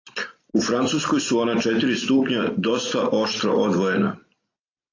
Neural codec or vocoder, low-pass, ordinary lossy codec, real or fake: none; 7.2 kHz; AAC, 32 kbps; real